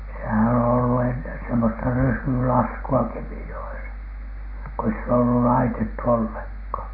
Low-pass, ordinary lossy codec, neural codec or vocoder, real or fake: 5.4 kHz; MP3, 32 kbps; none; real